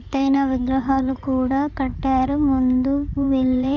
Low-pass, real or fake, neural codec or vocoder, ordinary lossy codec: 7.2 kHz; fake; codec, 16 kHz in and 24 kHz out, 2.2 kbps, FireRedTTS-2 codec; none